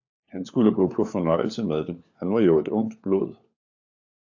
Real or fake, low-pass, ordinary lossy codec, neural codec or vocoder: fake; 7.2 kHz; AAC, 48 kbps; codec, 16 kHz, 4 kbps, FunCodec, trained on LibriTTS, 50 frames a second